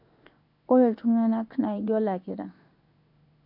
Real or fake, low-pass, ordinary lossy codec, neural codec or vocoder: fake; 5.4 kHz; MP3, 48 kbps; codec, 16 kHz in and 24 kHz out, 1 kbps, XY-Tokenizer